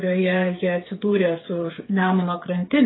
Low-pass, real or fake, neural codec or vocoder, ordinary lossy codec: 7.2 kHz; fake; codec, 16 kHz, 8 kbps, FreqCodec, smaller model; AAC, 16 kbps